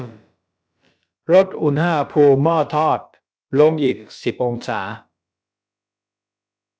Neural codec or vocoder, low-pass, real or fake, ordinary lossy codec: codec, 16 kHz, about 1 kbps, DyCAST, with the encoder's durations; none; fake; none